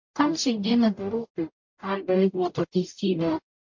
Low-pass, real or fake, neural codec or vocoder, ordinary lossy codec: 7.2 kHz; fake; codec, 44.1 kHz, 0.9 kbps, DAC; MP3, 48 kbps